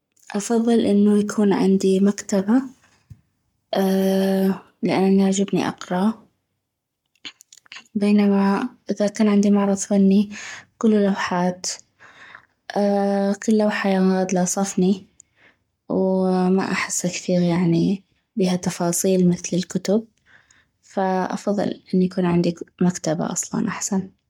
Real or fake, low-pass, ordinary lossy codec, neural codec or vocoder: fake; 19.8 kHz; MP3, 96 kbps; codec, 44.1 kHz, 7.8 kbps, Pupu-Codec